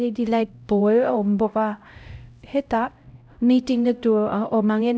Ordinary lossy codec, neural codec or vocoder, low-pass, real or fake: none; codec, 16 kHz, 0.5 kbps, X-Codec, HuBERT features, trained on LibriSpeech; none; fake